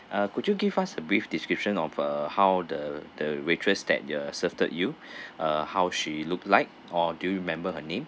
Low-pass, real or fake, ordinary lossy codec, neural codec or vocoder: none; real; none; none